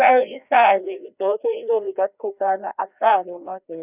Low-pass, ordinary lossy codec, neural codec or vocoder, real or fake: 3.6 kHz; none; codec, 16 kHz, 1 kbps, FreqCodec, larger model; fake